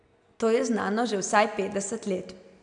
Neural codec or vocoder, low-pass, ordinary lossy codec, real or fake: none; 9.9 kHz; none; real